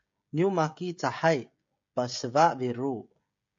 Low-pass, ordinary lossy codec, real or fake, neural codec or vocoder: 7.2 kHz; MP3, 48 kbps; fake; codec, 16 kHz, 16 kbps, FreqCodec, smaller model